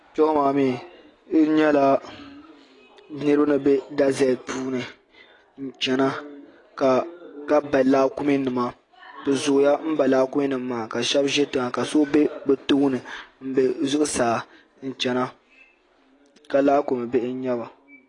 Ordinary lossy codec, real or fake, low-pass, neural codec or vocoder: AAC, 32 kbps; real; 10.8 kHz; none